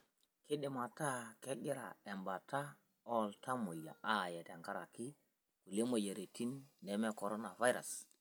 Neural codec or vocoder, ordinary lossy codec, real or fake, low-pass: none; none; real; none